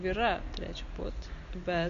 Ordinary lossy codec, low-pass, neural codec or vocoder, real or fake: AAC, 64 kbps; 7.2 kHz; none; real